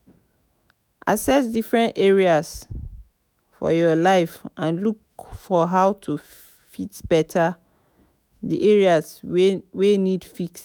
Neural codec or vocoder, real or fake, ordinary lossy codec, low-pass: autoencoder, 48 kHz, 128 numbers a frame, DAC-VAE, trained on Japanese speech; fake; none; none